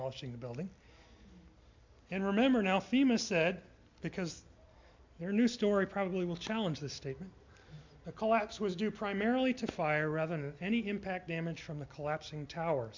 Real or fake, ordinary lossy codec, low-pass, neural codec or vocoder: real; MP3, 64 kbps; 7.2 kHz; none